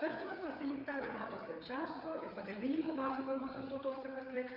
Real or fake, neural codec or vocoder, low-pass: fake; codec, 16 kHz, 4 kbps, FreqCodec, larger model; 5.4 kHz